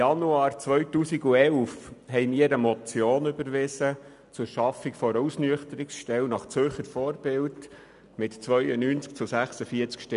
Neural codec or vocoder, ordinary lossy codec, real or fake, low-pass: none; none; real; 10.8 kHz